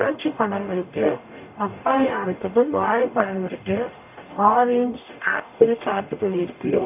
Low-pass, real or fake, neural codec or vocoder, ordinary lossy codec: 3.6 kHz; fake; codec, 44.1 kHz, 0.9 kbps, DAC; none